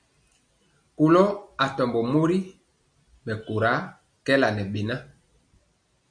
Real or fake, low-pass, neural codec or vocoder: real; 9.9 kHz; none